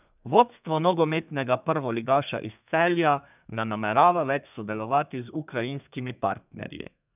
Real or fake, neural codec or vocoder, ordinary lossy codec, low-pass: fake; codec, 32 kHz, 1.9 kbps, SNAC; none; 3.6 kHz